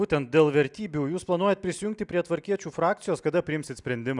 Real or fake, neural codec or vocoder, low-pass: real; none; 10.8 kHz